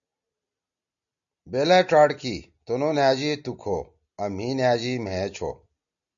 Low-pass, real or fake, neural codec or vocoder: 7.2 kHz; real; none